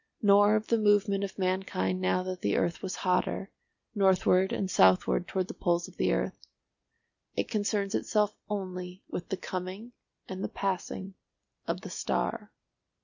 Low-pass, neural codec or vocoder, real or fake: 7.2 kHz; none; real